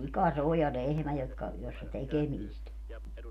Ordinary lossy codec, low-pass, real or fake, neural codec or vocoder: none; 14.4 kHz; real; none